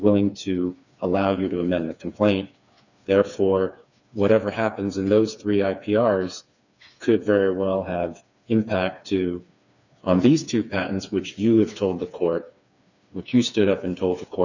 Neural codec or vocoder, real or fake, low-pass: codec, 16 kHz, 4 kbps, FreqCodec, smaller model; fake; 7.2 kHz